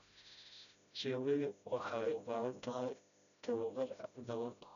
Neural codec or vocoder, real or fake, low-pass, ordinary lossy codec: codec, 16 kHz, 0.5 kbps, FreqCodec, smaller model; fake; 7.2 kHz; none